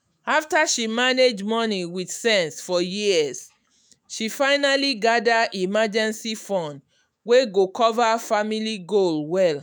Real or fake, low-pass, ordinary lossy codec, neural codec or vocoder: fake; none; none; autoencoder, 48 kHz, 128 numbers a frame, DAC-VAE, trained on Japanese speech